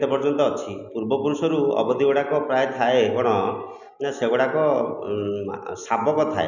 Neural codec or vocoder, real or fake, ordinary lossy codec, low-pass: none; real; none; 7.2 kHz